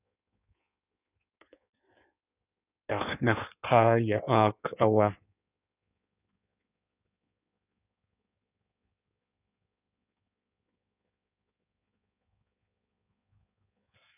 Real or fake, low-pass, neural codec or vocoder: fake; 3.6 kHz; codec, 16 kHz in and 24 kHz out, 1.1 kbps, FireRedTTS-2 codec